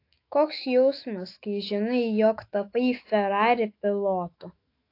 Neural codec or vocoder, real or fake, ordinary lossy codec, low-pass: autoencoder, 48 kHz, 128 numbers a frame, DAC-VAE, trained on Japanese speech; fake; AAC, 32 kbps; 5.4 kHz